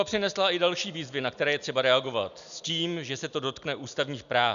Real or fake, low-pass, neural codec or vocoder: real; 7.2 kHz; none